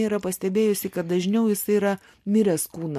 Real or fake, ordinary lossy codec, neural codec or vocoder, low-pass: real; MP3, 64 kbps; none; 14.4 kHz